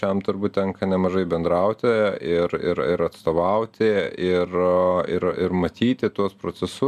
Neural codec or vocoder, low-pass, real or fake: none; 14.4 kHz; real